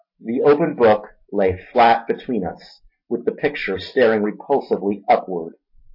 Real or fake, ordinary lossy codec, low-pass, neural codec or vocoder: real; MP3, 32 kbps; 5.4 kHz; none